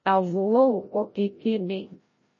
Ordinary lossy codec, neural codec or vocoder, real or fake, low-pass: MP3, 32 kbps; codec, 16 kHz, 0.5 kbps, FreqCodec, larger model; fake; 7.2 kHz